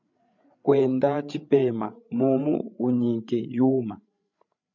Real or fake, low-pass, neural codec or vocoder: fake; 7.2 kHz; codec, 16 kHz, 8 kbps, FreqCodec, larger model